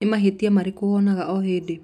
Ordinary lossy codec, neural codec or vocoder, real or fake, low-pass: none; none; real; 14.4 kHz